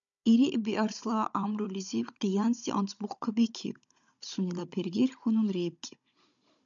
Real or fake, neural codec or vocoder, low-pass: fake; codec, 16 kHz, 16 kbps, FunCodec, trained on Chinese and English, 50 frames a second; 7.2 kHz